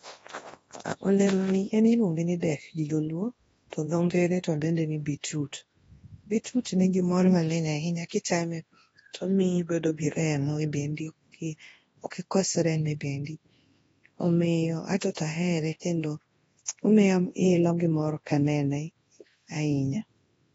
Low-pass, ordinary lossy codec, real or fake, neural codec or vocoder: 10.8 kHz; AAC, 24 kbps; fake; codec, 24 kHz, 0.9 kbps, WavTokenizer, large speech release